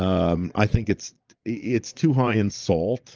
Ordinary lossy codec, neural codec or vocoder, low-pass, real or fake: Opus, 24 kbps; vocoder, 22.05 kHz, 80 mel bands, Vocos; 7.2 kHz; fake